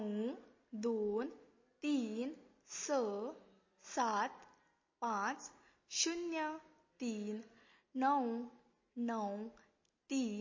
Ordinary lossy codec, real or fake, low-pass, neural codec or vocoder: MP3, 32 kbps; real; 7.2 kHz; none